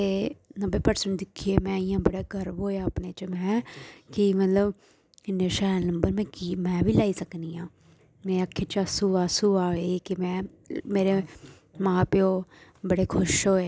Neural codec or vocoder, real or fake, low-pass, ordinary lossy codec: none; real; none; none